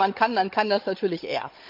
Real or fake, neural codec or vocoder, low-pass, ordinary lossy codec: fake; codec, 16 kHz, 16 kbps, FunCodec, trained on Chinese and English, 50 frames a second; 5.4 kHz; MP3, 48 kbps